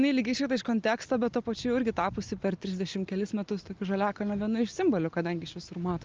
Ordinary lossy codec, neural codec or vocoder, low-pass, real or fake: Opus, 24 kbps; none; 7.2 kHz; real